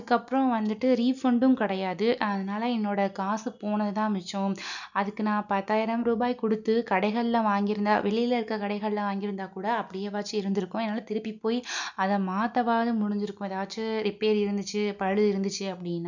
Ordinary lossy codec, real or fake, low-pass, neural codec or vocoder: none; real; 7.2 kHz; none